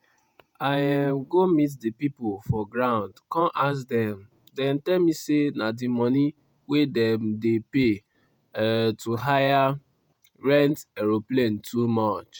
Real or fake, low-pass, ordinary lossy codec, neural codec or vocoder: fake; 19.8 kHz; none; vocoder, 48 kHz, 128 mel bands, Vocos